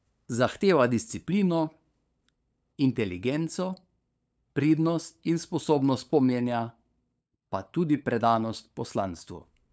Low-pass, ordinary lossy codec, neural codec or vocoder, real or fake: none; none; codec, 16 kHz, 8 kbps, FunCodec, trained on LibriTTS, 25 frames a second; fake